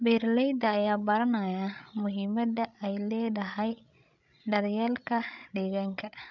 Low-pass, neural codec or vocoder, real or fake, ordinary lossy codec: 7.2 kHz; codec, 16 kHz, 16 kbps, FreqCodec, larger model; fake; none